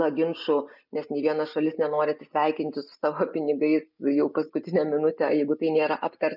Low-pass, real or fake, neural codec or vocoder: 5.4 kHz; real; none